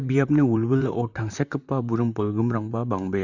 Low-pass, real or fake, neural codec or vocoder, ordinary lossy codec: 7.2 kHz; fake; vocoder, 44.1 kHz, 128 mel bands, Pupu-Vocoder; none